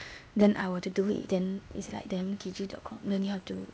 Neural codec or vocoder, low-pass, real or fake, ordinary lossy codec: codec, 16 kHz, 0.8 kbps, ZipCodec; none; fake; none